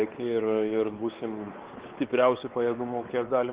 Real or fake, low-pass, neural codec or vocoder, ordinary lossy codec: fake; 3.6 kHz; codec, 16 kHz, 4 kbps, X-Codec, WavLM features, trained on Multilingual LibriSpeech; Opus, 16 kbps